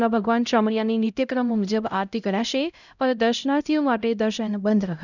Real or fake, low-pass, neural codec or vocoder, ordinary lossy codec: fake; 7.2 kHz; codec, 16 kHz, 0.5 kbps, X-Codec, HuBERT features, trained on LibriSpeech; none